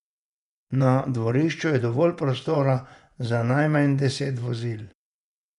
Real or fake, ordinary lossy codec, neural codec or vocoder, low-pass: fake; none; vocoder, 24 kHz, 100 mel bands, Vocos; 10.8 kHz